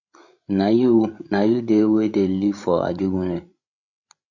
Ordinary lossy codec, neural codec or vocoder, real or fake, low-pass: Opus, 64 kbps; codec, 16 kHz, 16 kbps, FreqCodec, larger model; fake; 7.2 kHz